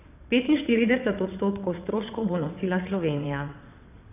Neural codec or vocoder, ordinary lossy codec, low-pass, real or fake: vocoder, 22.05 kHz, 80 mel bands, WaveNeXt; none; 3.6 kHz; fake